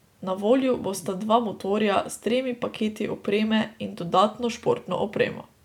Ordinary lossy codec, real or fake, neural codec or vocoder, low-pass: none; real; none; 19.8 kHz